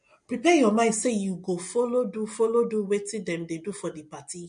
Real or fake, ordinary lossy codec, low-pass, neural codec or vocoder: real; MP3, 48 kbps; 9.9 kHz; none